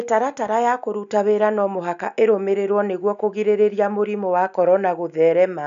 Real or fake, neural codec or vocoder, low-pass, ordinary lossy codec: real; none; 7.2 kHz; none